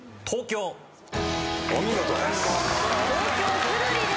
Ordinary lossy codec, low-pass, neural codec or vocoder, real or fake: none; none; none; real